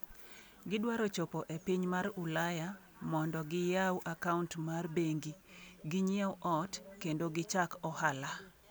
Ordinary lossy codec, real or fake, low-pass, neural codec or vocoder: none; real; none; none